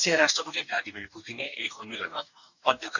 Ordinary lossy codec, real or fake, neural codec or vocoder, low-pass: none; fake; codec, 44.1 kHz, 2.6 kbps, DAC; 7.2 kHz